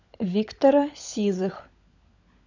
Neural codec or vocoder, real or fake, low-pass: codec, 16 kHz, 16 kbps, FunCodec, trained on LibriTTS, 50 frames a second; fake; 7.2 kHz